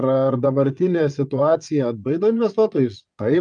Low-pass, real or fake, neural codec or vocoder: 9.9 kHz; fake; vocoder, 22.05 kHz, 80 mel bands, Vocos